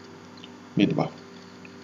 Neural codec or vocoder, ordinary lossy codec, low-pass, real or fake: none; none; 14.4 kHz; real